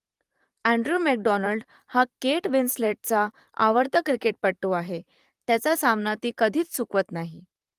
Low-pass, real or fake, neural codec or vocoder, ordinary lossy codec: 14.4 kHz; fake; vocoder, 44.1 kHz, 128 mel bands, Pupu-Vocoder; Opus, 32 kbps